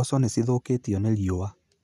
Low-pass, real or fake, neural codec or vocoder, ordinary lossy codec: 14.4 kHz; real; none; none